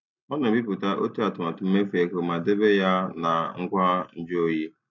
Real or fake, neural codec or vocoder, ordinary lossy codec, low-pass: real; none; none; 7.2 kHz